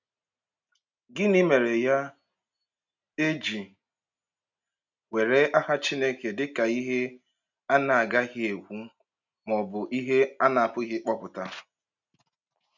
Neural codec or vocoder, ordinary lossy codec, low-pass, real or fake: none; none; 7.2 kHz; real